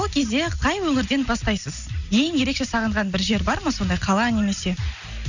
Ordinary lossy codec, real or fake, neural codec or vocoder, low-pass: none; real; none; 7.2 kHz